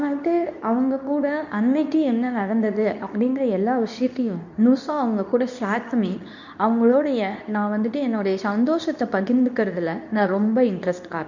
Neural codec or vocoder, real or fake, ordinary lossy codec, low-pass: codec, 24 kHz, 0.9 kbps, WavTokenizer, medium speech release version 2; fake; none; 7.2 kHz